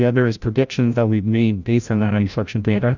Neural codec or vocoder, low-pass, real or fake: codec, 16 kHz, 0.5 kbps, FreqCodec, larger model; 7.2 kHz; fake